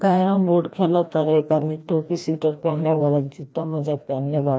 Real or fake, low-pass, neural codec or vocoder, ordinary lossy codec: fake; none; codec, 16 kHz, 1 kbps, FreqCodec, larger model; none